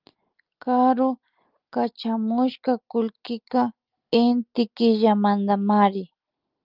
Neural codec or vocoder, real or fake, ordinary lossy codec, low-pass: none; real; Opus, 24 kbps; 5.4 kHz